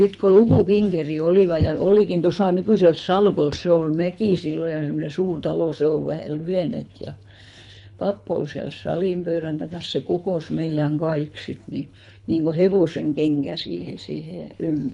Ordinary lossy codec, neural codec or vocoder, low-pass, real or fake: none; codec, 24 kHz, 3 kbps, HILCodec; 10.8 kHz; fake